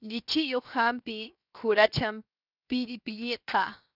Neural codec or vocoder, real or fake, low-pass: codec, 16 kHz, 0.7 kbps, FocalCodec; fake; 5.4 kHz